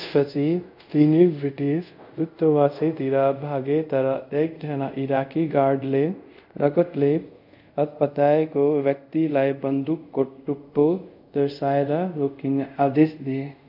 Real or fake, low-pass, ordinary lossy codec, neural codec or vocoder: fake; 5.4 kHz; none; codec, 24 kHz, 0.5 kbps, DualCodec